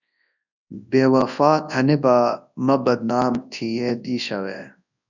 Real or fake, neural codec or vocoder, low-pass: fake; codec, 24 kHz, 0.9 kbps, WavTokenizer, large speech release; 7.2 kHz